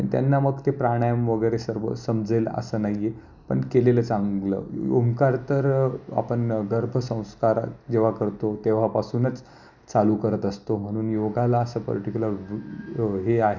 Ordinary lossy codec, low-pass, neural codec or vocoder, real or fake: none; 7.2 kHz; none; real